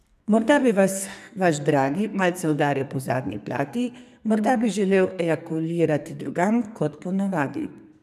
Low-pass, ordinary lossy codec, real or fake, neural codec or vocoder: 14.4 kHz; none; fake; codec, 44.1 kHz, 2.6 kbps, SNAC